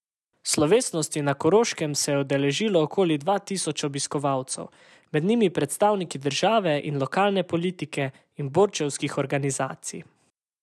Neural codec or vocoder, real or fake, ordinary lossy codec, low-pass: none; real; none; none